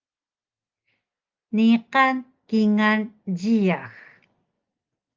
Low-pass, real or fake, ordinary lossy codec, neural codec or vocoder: 7.2 kHz; real; Opus, 32 kbps; none